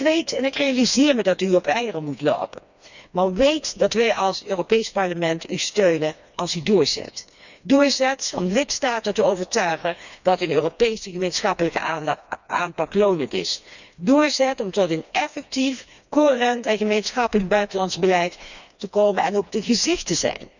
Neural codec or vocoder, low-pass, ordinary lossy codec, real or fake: codec, 16 kHz, 2 kbps, FreqCodec, smaller model; 7.2 kHz; none; fake